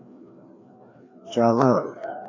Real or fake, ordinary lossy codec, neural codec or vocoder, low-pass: fake; AAC, 32 kbps; codec, 16 kHz, 2 kbps, FreqCodec, larger model; 7.2 kHz